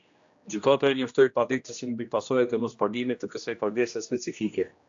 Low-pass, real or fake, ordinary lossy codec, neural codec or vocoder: 7.2 kHz; fake; AAC, 64 kbps; codec, 16 kHz, 1 kbps, X-Codec, HuBERT features, trained on general audio